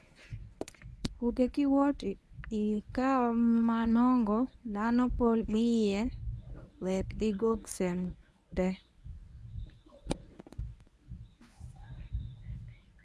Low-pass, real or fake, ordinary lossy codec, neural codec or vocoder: none; fake; none; codec, 24 kHz, 0.9 kbps, WavTokenizer, medium speech release version 1